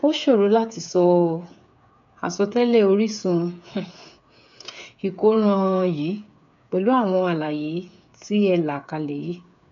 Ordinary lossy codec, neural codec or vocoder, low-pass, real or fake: none; codec, 16 kHz, 8 kbps, FreqCodec, smaller model; 7.2 kHz; fake